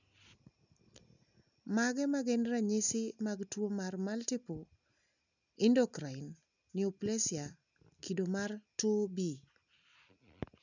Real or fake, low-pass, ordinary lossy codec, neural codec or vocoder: real; 7.2 kHz; none; none